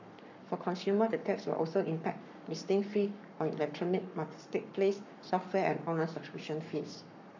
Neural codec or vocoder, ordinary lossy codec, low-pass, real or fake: codec, 44.1 kHz, 7.8 kbps, Pupu-Codec; none; 7.2 kHz; fake